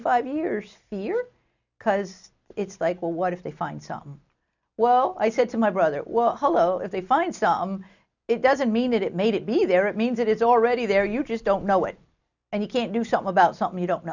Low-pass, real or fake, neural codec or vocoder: 7.2 kHz; real; none